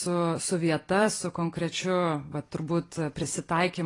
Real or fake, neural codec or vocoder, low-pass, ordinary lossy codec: fake; vocoder, 44.1 kHz, 128 mel bands every 256 samples, BigVGAN v2; 10.8 kHz; AAC, 32 kbps